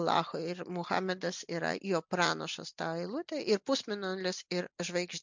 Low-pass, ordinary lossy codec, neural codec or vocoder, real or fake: 7.2 kHz; MP3, 64 kbps; none; real